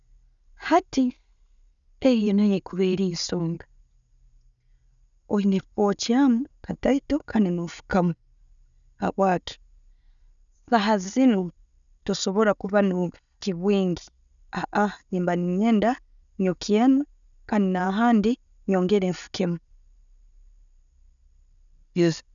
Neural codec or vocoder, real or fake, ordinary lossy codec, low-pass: none; real; none; 7.2 kHz